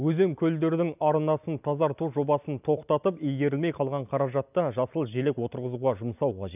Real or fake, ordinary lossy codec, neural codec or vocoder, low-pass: real; none; none; 3.6 kHz